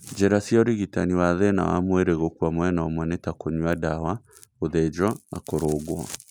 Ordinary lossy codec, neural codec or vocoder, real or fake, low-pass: none; none; real; none